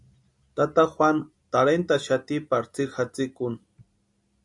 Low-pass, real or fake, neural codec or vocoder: 10.8 kHz; real; none